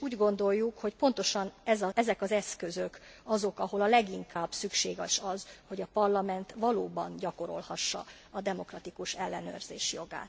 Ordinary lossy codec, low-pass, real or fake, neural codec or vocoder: none; none; real; none